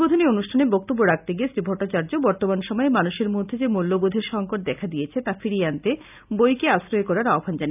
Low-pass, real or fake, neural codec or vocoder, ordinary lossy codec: 3.6 kHz; real; none; none